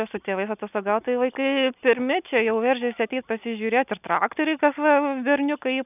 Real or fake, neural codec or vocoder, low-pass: real; none; 3.6 kHz